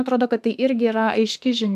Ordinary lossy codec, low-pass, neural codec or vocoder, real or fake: AAC, 96 kbps; 14.4 kHz; autoencoder, 48 kHz, 32 numbers a frame, DAC-VAE, trained on Japanese speech; fake